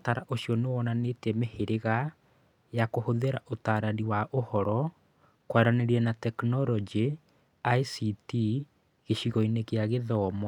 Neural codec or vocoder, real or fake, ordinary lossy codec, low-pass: none; real; none; 19.8 kHz